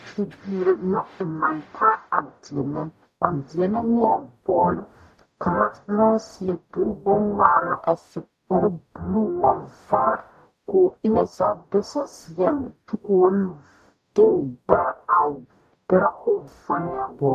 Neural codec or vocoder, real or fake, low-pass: codec, 44.1 kHz, 0.9 kbps, DAC; fake; 14.4 kHz